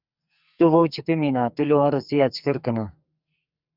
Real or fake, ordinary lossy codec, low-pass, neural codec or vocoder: fake; Opus, 64 kbps; 5.4 kHz; codec, 44.1 kHz, 2.6 kbps, SNAC